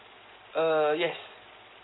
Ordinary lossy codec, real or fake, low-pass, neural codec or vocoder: AAC, 16 kbps; real; 7.2 kHz; none